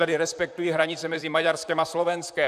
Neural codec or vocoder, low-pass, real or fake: vocoder, 44.1 kHz, 128 mel bands, Pupu-Vocoder; 14.4 kHz; fake